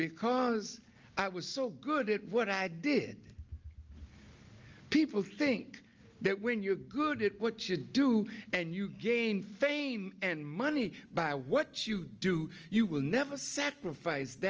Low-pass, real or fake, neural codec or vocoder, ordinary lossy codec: 7.2 kHz; real; none; Opus, 24 kbps